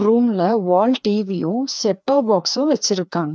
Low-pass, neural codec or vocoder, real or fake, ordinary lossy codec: none; codec, 16 kHz, 2 kbps, FreqCodec, larger model; fake; none